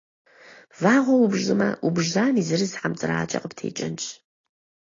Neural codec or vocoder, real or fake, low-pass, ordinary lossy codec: none; real; 7.2 kHz; AAC, 32 kbps